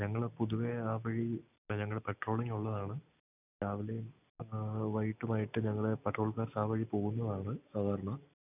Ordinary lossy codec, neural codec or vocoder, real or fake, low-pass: none; none; real; 3.6 kHz